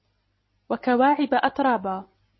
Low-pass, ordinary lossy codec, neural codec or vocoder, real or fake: 7.2 kHz; MP3, 24 kbps; none; real